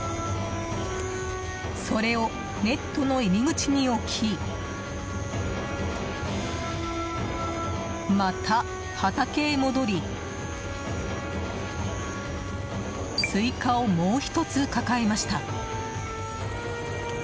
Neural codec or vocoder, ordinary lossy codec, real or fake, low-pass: none; none; real; none